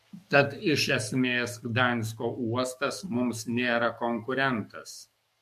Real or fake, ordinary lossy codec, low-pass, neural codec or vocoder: fake; MP3, 64 kbps; 14.4 kHz; codec, 44.1 kHz, 7.8 kbps, DAC